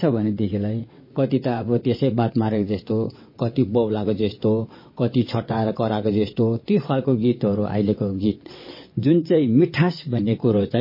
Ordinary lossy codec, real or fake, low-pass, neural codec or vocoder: MP3, 24 kbps; fake; 5.4 kHz; vocoder, 22.05 kHz, 80 mel bands, WaveNeXt